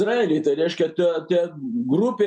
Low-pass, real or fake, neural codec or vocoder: 9.9 kHz; real; none